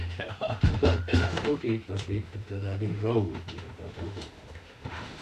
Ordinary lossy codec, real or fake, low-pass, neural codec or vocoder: none; fake; 14.4 kHz; autoencoder, 48 kHz, 32 numbers a frame, DAC-VAE, trained on Japanese speech